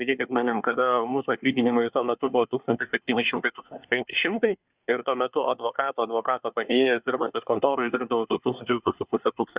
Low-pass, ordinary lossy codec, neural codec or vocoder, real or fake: 3.6 kHz; Opus, 64 kbps; codec, 24 kHz, 1 kbps, SNAC; fake